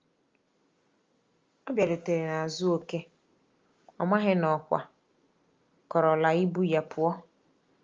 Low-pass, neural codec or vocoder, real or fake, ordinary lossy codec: 7.2 kHz; none; real; Opus, 32 kbps